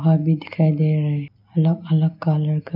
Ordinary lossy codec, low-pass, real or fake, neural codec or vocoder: none; 5.4 kHz; real; none